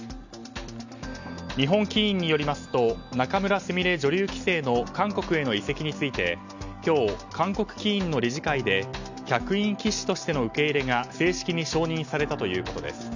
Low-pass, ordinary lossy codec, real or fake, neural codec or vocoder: 7.2 kHz; none; real; none